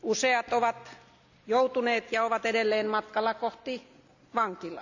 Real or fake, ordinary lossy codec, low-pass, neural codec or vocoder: real; none; 7.2 kHz; none